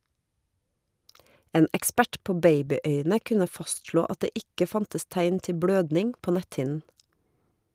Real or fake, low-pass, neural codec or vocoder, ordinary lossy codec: real; 14.4 kHz; none; Opus, 32 kbps